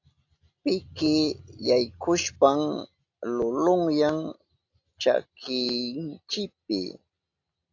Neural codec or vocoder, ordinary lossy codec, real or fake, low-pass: none; AAC, 48 kbps; real; 7.2 kHz